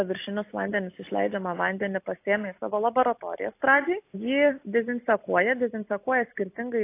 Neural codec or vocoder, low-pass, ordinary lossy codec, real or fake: none; 3.6 kHz; AAC, 24 kbps; real